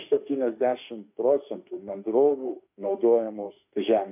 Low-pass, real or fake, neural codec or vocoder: 3.6 kHz; fake; autoencoder, 48 kHz, 32 numbers a frame, DAC-VAE, trained on Japanese speech